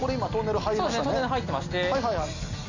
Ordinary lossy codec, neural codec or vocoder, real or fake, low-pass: none; none; real; 7.2 kHz